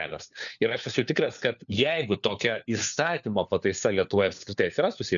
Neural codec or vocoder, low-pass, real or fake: codec, 16 kHz, 4 kbps, FunCodec, trained on LibriTTS, 50 frames a second; 7.2 kHz; fake